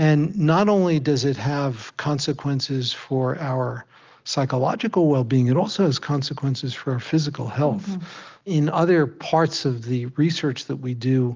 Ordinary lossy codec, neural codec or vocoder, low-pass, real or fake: Opus, 32 kbps; none; 7.2 kHz; real